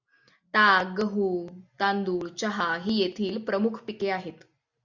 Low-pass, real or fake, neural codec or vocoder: 7.2 kHz; real; none